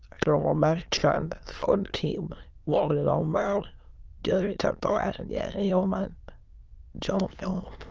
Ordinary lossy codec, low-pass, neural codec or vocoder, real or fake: Opus, 24 kbps; 7.2 kHz; autoencoder, 22.05 kHz, a latent of 192 numbers a frame, VITS, trained on many speakers; fake